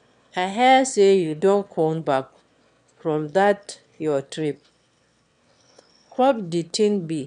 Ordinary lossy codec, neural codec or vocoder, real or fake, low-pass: none; autoencoder, 22.05 kHz, a latent of 192 numbers a frame, VITS, trained on one speaker; fake; 9.9 kHz